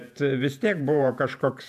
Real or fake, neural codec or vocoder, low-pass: fake; vocoder, 48 kHz, 128 mel bands, Vocos; 14.4 kHz